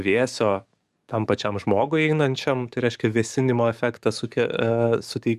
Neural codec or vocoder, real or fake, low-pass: codec, 44.1 kHz, 7.8 kbps, DAC; fake; 14.4 kHz